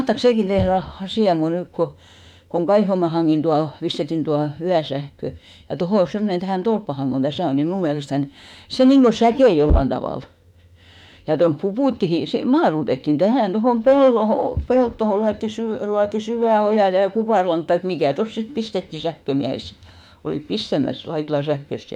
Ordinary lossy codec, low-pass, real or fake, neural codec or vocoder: none; 19.8 kHz; fake; autoencoder, 48 kHz, 32 numbers a frame, DAC-VAE, trained on Japanese speech